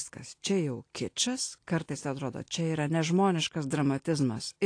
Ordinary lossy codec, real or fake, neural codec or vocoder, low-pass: AAC, 48 kbps; real; none; 9.9 kHz